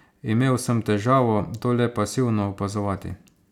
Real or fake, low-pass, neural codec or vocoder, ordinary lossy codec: real; 19.8 kHz; none; none